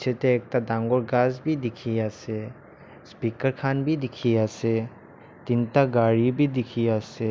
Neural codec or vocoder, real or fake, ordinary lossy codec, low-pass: none; real; none; none